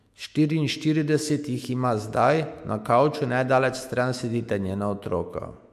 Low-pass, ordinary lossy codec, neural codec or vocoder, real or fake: 14.4 kHz; MP3, 64 kbps; autoencoder, 48 kHz, 128 numbers a frame, DAC-VAE, trained on Japanese speech; fake